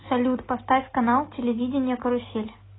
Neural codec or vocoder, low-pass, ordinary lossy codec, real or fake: none; 7.2 kHz; AAC, 16 kbps; real